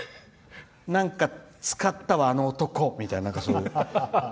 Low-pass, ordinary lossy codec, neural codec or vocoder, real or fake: none; none; none; real